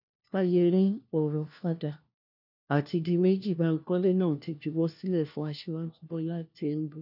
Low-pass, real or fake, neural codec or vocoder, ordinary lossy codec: 5.4 kHz; fake; codec, 16 kHz, 1 kbps, FunCodec, trained on LibriTTS, 50 frames a second; none